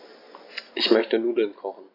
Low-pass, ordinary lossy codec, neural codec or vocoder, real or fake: 5.4 kHz; AAC, 24 kbps; none; real